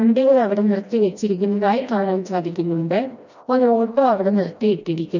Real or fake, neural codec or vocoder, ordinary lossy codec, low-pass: fake; codec, 16 kHz, 1 kbps, FreqCodec, smaller model; none; 7.2 kHz